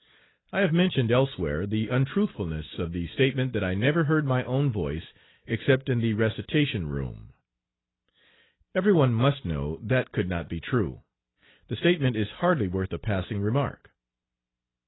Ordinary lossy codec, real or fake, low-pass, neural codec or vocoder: AAC, 16 kbps; real; 7.2 kHz; none